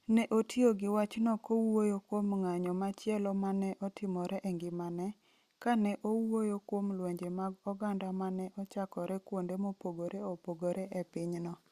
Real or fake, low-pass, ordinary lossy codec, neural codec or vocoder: real; 14.4 kHz; Opus, 64 kbps; none